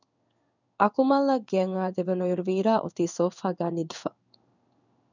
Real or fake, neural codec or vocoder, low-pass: fake; codec, 16 kHz in and 24 kHz out, 1 kbps, XY-Tokenizer; 7.2 kHz